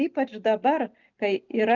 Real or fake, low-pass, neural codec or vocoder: real; 7.2 kHz; none